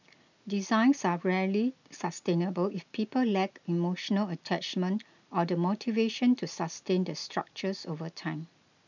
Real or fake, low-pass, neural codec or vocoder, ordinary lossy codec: real; 7.2 kHz; none; none